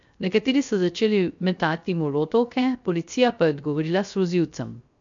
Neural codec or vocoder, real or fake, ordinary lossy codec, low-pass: codec, 16 kHz, 0.3 kbps, FocalCodec; fake; MP3, 64 kbps; 7.2 kHz